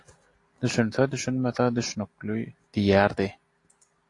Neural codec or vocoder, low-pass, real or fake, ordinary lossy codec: none; 10.8 kHz; real; AAC, 48 kbps